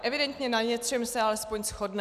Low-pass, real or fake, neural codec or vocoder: 14.4 kHz; real; none